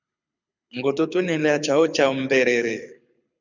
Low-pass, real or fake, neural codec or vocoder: 7.2 kHz; fake; codec, 24 kHz, 6 kbps, HILCodec